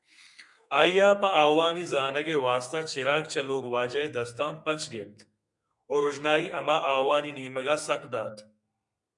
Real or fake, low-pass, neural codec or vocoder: fake; 10.8 kHz; codec, 32 kHz, 1.9 kbps, SNAC